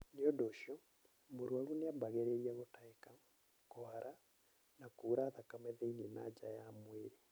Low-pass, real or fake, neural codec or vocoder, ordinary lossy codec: none; real; none; none